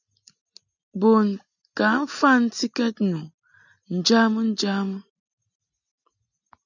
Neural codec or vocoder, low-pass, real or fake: none; 7.2 kHz; real